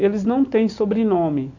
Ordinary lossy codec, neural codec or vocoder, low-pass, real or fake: none; none; 7.2 kHz; real